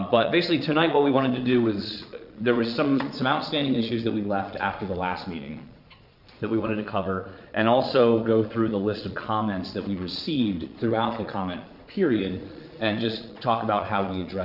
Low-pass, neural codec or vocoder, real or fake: 5.4 kHz; vocoder, 22.05 kHz, 80 mel bands, Vocos; fake